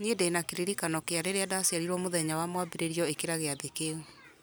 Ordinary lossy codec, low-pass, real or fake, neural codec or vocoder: none; none; real; none